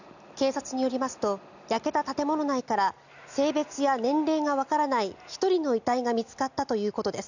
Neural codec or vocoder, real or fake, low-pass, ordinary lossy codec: none; real; 7.2 kHz; none